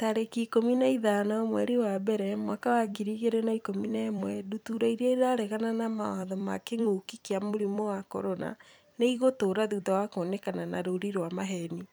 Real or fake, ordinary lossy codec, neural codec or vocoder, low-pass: fake; none; vocoder, 44.1 kHz, 128 mel bands every 256 samples, BigVGAN v2; none